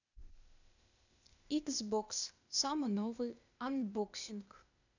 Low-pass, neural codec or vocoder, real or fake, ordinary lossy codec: 7.2 kHz; codec, 16 kHz, 0.8 kbps, ZipCodec; fake; none